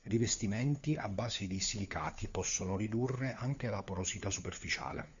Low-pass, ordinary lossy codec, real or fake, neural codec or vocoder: 7.2 kHz; Opus, 64 kbps; fake; codec, 16 kHz, 4 kbps, FunCodec, trained on LibriTTS, 50 frames a second